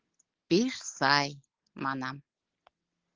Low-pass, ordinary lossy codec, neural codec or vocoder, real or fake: 7.2 kHz; Opus, 16 kbps; none; real